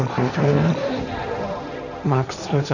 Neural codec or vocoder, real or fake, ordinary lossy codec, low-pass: codec, 16 kHz, 1.1 kbps, Voila-Tokenizer; fake; none; 7.2 kHz